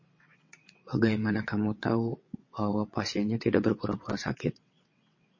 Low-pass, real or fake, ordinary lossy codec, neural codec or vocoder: 7.2 kHz; fake; MP3, 32 kbps; vocoder, 22.05 kHz, 80 mel bands, WaveNeXt